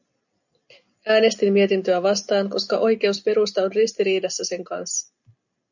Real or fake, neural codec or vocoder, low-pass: real; none; 7.2 kHz